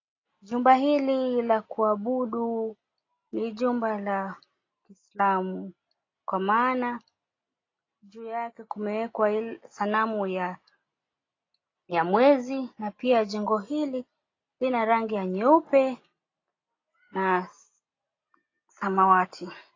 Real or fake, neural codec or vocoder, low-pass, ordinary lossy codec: real; none; 7.2 kHz; AAC, 32 kbps